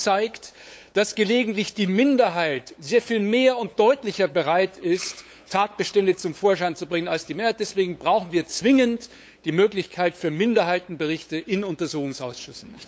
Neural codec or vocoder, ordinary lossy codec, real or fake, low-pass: codec, 16 kHz, 8 kbps, FunCodec, trained on LibriTTS, 25 frames a second; none; fake; none